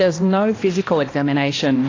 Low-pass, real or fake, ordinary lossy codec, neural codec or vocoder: 7.2 kHz; fake; AAC, 32 kbps; codec, 16 kHz, 1 kbps, X-Codec, HuBERT features, trained on balanced general audio